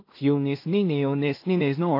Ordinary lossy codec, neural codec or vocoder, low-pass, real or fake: AAC, 32 kbps; codec, 16 kHz in and 24 kHz out, 0.4 kbps, LongCat-Audio-Codec, two codebook decoder; 5.4 kHz; fake